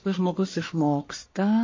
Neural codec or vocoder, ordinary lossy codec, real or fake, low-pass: codec, 44.1 kHz, 1.7 kbps, Pupu-Codec; MP3, 32 kbps; fake; 7.2 kHz